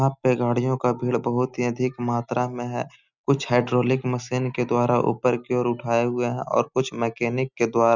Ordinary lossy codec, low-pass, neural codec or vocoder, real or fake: none; 7.2 kHz; none; real